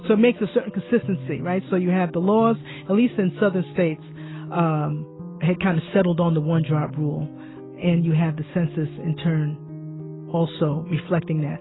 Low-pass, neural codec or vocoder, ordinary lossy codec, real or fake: 7.2 kHz; none; AAC, 16 kbps; real